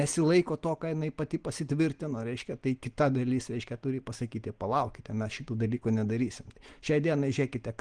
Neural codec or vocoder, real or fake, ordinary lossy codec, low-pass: none; real; Opus, 24 kbps; 9.9 kHz